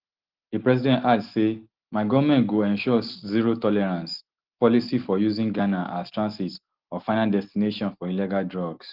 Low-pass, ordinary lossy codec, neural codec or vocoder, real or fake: 5.4 kHz; Opus, 16 kbps; none; real